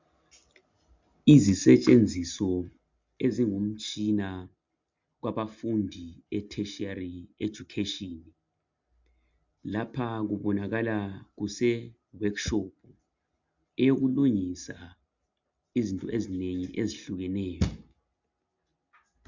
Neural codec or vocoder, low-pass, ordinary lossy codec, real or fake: none; 7.2 kHz; MP3, 64 kbps; real